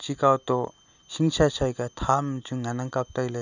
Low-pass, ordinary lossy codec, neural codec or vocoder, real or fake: 7.2 kHz; none; none; real